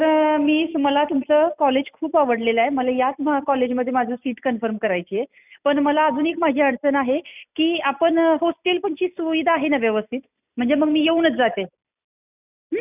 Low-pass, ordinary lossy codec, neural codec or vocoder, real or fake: 3.6 kHz; none; none; real